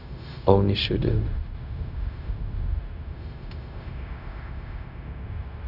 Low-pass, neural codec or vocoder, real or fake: 5.4 kHz; codec, 16 kHz, 0.4 kbps, LongCat-Audio-Codec; fake